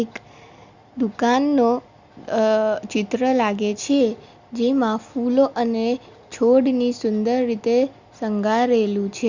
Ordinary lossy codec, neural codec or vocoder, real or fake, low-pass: Opus, 64 kbps; none; real; 7.2 kHz